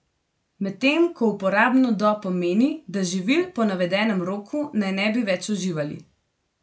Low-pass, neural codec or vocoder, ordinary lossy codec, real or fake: none; none; none; real